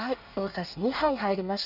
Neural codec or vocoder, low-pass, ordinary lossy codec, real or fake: codec, 24 kHz, 1 kbps, SNAC; 5.4 kHz; none; fake